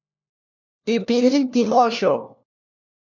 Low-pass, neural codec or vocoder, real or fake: 7.2 kHz; codec, 16 kHz, 1 kbps, FunCodec, trained on LibriTTS, 50 frames a second; fake